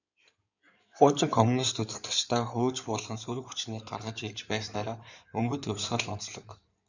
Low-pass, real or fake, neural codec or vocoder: 7.2 kHz; fake; codec, 16 kHz in and 24 kHz out, 2.2 kbps, FireRedTTS-2 codec